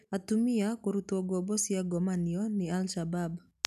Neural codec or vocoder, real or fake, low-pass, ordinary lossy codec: none; real; 14.4 kHz; none